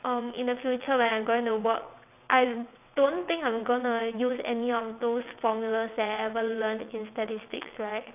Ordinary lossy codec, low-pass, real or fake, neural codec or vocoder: none; 3.6 kHz; fake; vocoder, 22.05 kHz, 80 mel bands, WaveNeXt